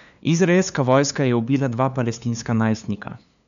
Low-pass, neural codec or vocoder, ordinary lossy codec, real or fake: 7.2 kHz; codec, 16 kHz, 2 kbps, FunCodec, trained on LibriTTS, 25 frames a second; none; fake